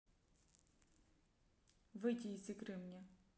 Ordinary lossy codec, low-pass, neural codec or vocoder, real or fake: none; none; none; real